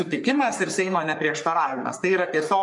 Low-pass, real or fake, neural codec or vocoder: 10.8 kHz; fake; codec, 44.1 kHz, 3.4 kbps, Pupu-Codec